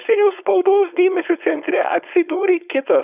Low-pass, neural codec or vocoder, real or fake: 3.6 kHz; codec, 16 kHz, 4.8 kbps, FACodec; fake